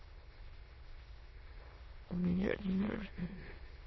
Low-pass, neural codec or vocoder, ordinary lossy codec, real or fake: 7.2 kHz; autoencoder, 22.05 kHz, a latent of 192 numbers a frame, VITS, trained on many speakers; MP3, 24 kbps; fake